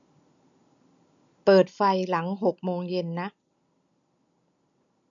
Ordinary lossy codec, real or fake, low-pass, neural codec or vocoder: none; real; 7.2 kHz; none